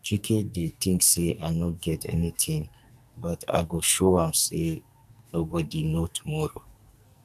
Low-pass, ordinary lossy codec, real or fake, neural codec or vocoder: 14.4 kHz; none; fake; codec, 44.1 kHz, 2.6 kbps, SNAC